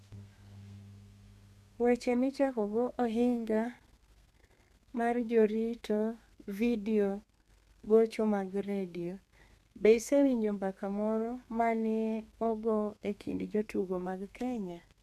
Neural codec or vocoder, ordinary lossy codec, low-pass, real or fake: codec, 32 kHz, 1.9 kbps, SNAC; none; 14.4 kHz; fake